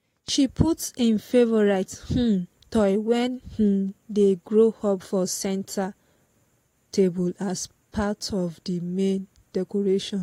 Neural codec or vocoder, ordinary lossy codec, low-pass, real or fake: none; AAC, 48 kbps; 19.8 kHz; real